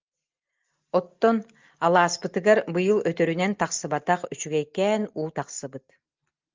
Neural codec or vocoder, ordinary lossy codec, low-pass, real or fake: none; Opus, 32 kbps; 7.2 kHz; real